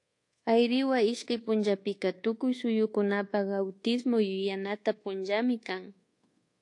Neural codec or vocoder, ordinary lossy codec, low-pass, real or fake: codec, 24 kHz, 1.2 kbps, DualCodec; AAC, 48 kbps; 10.8 kHz; fake